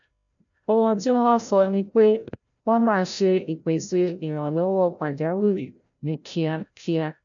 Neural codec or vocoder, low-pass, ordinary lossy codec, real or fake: codec, 16 kHz, 0.5 kbps, FreqCodec, larger model; 7.2 kHz; none; fake